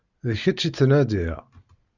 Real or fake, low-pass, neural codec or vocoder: real; 7.2 kHz; none